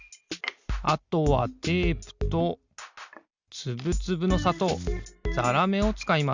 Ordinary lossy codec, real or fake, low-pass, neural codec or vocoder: Opus, 64 kbps; real; 7.2 kHz; none